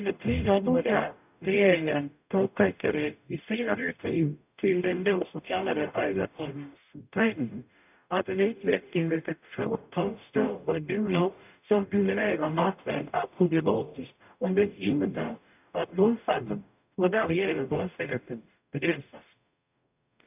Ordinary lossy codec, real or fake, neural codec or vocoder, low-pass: none; fake; codec, 44.1 kHz, 0.9 kbps, DAC; 3.6 kHz